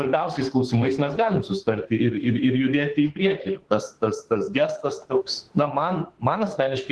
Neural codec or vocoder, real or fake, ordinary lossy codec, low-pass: autoencoder, 48 kHz, 32 numbers a frame, DAC-VAE, trained on Japanese speech; fake; Opus, 16 kbps; 10.8 kHz